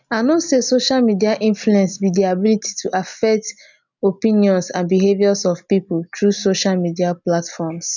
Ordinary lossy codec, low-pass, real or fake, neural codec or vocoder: none; 7.2 kHz; real; none